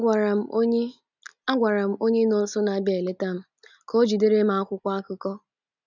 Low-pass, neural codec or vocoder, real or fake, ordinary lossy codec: 7.2 kHz; none; real; none